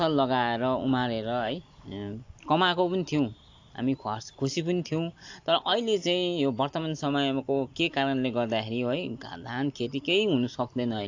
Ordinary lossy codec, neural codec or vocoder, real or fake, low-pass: AAC, 48 kbps; none; real; 7.2 kHz